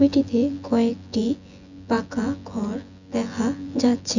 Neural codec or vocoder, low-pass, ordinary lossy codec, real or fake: vocoder, 24 kHz, 100 mel bands, Vocos; 7.2 kHz; none; fake